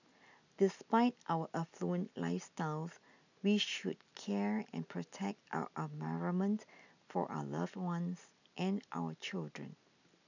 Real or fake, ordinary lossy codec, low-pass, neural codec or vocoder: real; none; 7.2 kHz; none